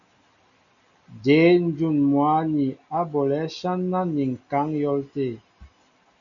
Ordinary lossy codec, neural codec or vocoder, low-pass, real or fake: MP3, 96 kbps; none; 7.2 kHz; real